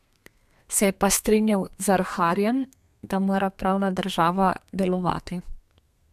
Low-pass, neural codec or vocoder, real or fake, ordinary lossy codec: 14.4 kHz; codec, 32 kHz, 1.9 kbps, SNAC; fake; none